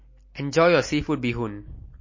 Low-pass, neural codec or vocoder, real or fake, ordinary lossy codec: 7.2 kHz; none; real; MP3, 32 kbps